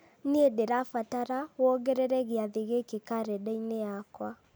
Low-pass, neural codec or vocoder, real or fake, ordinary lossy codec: none; none; real; none